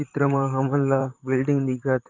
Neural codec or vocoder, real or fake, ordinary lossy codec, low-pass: vocoder, 44.1 kHz, 128 mel bands, Pupu-Vocoder; fake; Opus, 24 kbps; 7.2 kHz